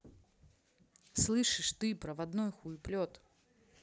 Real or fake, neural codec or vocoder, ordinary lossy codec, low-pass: real; none; none; none